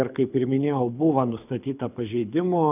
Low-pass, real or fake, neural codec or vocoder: 3.6 kHz; fake; codec, 24 kHz, 6 kbps, HILCodec